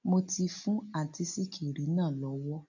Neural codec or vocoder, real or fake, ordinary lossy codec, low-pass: none; real; none; 7.2 kHz